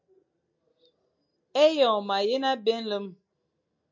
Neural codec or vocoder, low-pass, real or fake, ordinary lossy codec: none; 7.2 kHz; real; MP3, 48 kbps